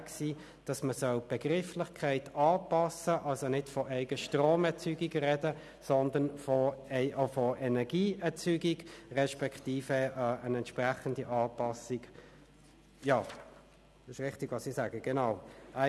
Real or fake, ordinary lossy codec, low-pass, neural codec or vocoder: real; none; none; none